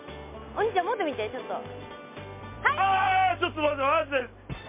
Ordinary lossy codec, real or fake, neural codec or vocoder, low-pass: MP3, 24 kbps; real; none; 3.6 kHz